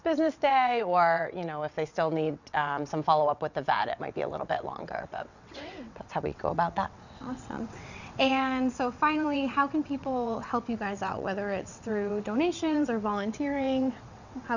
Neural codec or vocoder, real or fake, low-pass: vocoder, 22.05 kHz, 80 mel bands, WaveNeXt; fake; 7.2 kHz